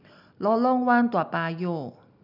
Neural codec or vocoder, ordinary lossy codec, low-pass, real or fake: none; none; 5.4 kHz; real